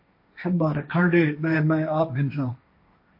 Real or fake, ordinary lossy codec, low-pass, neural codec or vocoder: fake; MP3, 32 kbps; 5.4 kHz; codec, 16 kHz, 1.1 kbps, Voila-Tokenizer